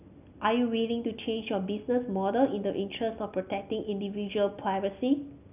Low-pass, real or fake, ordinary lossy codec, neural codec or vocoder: 3.6 kHz; real; none; none